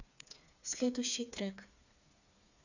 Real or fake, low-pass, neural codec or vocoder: fake; 7.2 kHz; codec, 16 kHz, 4 kbps, FreqCodec, smaller model